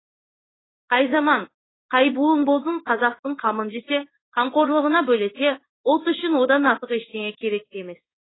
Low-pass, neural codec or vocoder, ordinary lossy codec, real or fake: 7.2 kHz; codec, 16 kHz in and 24 kHz out, 1 kbps, XY-Tokenizer; AAC, 16 kbps; fake